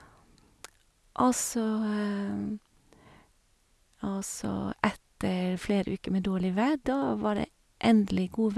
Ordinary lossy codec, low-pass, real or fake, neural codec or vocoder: none; none; real; none